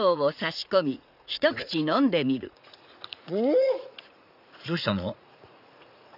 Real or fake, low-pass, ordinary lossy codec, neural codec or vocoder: fake; 5.4 kHz; none; vocoder, 44.1 kHz, 80 mel bands, Vocos